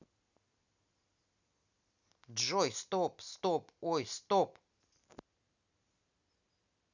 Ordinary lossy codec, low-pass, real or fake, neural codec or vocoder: none; 7.2 kHz; real; none